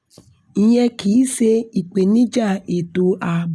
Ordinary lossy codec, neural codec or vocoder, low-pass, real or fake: none; none; none; real